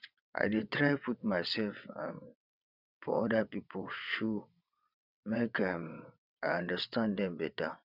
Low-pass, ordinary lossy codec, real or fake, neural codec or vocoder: 5.4 kHz; none; fake; vocoder, 22.05 kHz, 80 mel bands, WaveNeXt